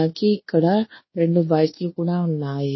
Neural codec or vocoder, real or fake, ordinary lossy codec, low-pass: codec, 24 kHz, 1.2 kbps, DualCodec; fake; MP3, 24 kbps; 7.2 kHz